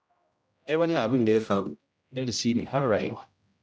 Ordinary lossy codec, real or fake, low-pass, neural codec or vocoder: none; fake; none; codec, 16 kHz, 0.5 kbps, X-Codec, HuBERT features, trained on general audio